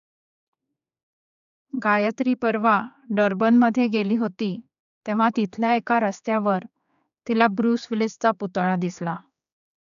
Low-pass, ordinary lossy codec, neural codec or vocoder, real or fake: 7.2 kHz; none; codec, 16 kHz, 4 kbps, X-Codec, HuBERT features, trained on general audio; fake